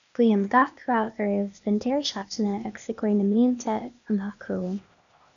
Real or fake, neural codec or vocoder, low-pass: fake; codec, 16 kHz, 0.8 kbps, ZipCodec; 7.2 kHz